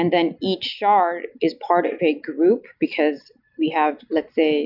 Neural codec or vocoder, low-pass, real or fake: none; 5.4 kHz; real